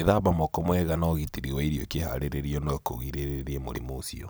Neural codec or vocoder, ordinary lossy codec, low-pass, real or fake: none; none; none; real